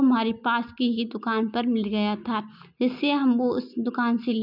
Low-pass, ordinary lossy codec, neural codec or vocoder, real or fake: 5.4 kHz; none; none; real